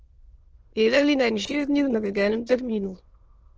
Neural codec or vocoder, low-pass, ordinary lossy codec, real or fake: autoencoder, 22.05 kHz, a latent of 192 numbers a frame, VITS, trained on many speakers; 7.2 kHz; Opus, 16 kbps; fake